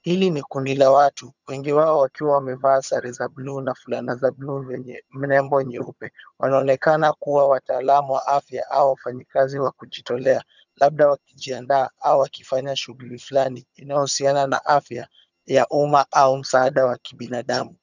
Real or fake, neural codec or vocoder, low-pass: fake; vocoder, 22.05 kHz, 80 mel bands, HiFi-GAN; 7.2 kHz